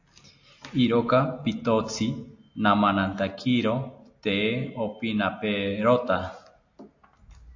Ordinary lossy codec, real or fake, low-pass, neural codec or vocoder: AAC, 48 kbps; real; 7.2 kHz; none